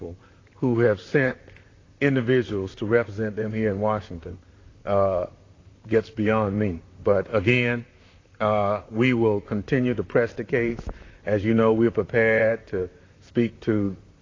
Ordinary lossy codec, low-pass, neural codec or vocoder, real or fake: AAC, 32 kbps; 7.2 kHz; vocoder, 44.1 kHz, 128 mel bands, Pupu-Vocoder; fake